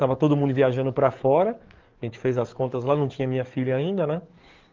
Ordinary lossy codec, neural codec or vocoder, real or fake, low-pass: Opus, 24 kbps; codec, 44.1 kHz, 7.8 kbps, DAC; fake; 7.2 kHz